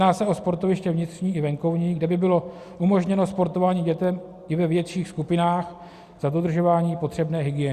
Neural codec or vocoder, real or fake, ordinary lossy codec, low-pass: none; real; Opus, 64 kbps; 14.4 kHz